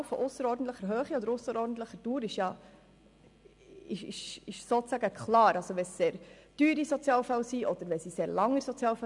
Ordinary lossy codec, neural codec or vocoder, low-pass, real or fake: MP3, 96 kbps; none; 10.8 kHz; real